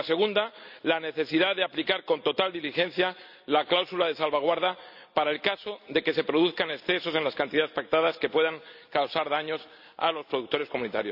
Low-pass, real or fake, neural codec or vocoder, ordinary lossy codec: 5.4 kHz; real; none; none